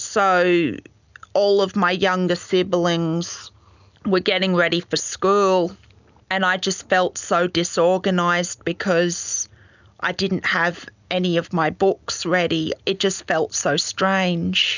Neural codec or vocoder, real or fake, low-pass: none; real; 7.2 kHz